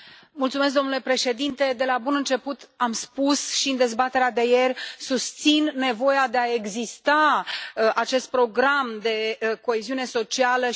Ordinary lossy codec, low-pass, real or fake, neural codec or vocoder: none; none; real; none